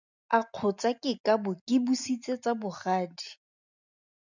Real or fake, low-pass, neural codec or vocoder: real; 7.2 kHz; none